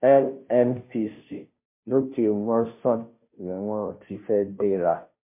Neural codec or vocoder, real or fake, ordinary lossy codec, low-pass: codec, 16 kHz, 0.5 kbps, FunCodec, trained on Chinese and English, 25 frames a second; fake; MP3, 24 kbps; 3.6 kHz